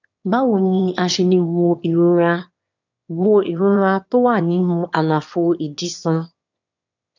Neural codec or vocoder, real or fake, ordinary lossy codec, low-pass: autoencoder, 22.05 kHz, a latent of 192 numbers a frame, VITS, trained on one speaker; fake; none; 7.2 kHz